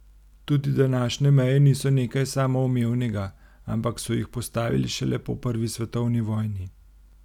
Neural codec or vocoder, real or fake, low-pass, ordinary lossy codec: none; real; 19.8 kHz; none